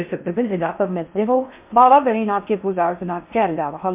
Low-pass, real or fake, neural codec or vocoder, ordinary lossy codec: 3.6 kHz; fake; codec, 16 kHz in and 24 kHz out, 0.6 kbps, FocalCodec, streaming, 4096 codes; MP3, 32 kbps